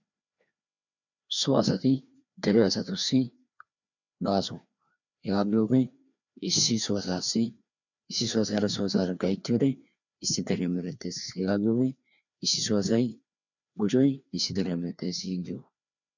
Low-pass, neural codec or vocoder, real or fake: 7.2 kHz; codec, 16 kHz, 2 kbps, FreqCodec, larger model; fake